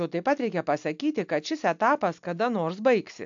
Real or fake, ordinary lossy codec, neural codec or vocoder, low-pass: real; AAC, 64 kbps; none; 7.2 kHz